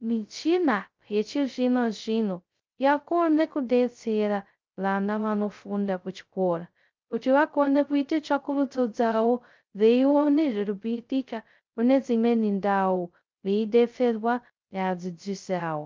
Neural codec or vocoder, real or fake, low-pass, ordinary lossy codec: codec, 16 kHz, 0.2 kbps, FocalCodec; fake; 7.2 kHz; Opus, 24 kbps